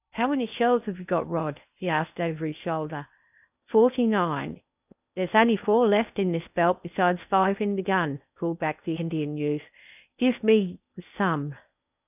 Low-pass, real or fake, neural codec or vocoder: 3.6 kHz; fake; codec, 16 kHz in and 24 kHz out, 0.8 kbps, FocalCodec, streaming, 65536 codes